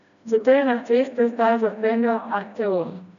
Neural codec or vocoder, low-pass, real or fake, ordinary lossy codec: codec, 16 kHz, 1 kbps, FreqCodec, smaller model; 7.2 kHz; fake; none